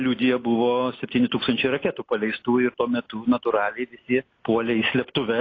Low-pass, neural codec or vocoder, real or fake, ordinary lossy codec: 7.2 kHz; none; real; AAC, 32 kbps